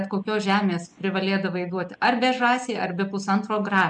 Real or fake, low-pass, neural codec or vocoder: real; 10.8 kHz; none